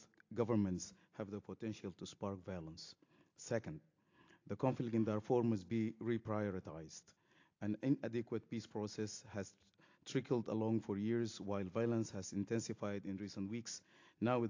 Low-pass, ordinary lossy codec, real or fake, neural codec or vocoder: 7.2 kHz; AAC, 48 kbps; real; none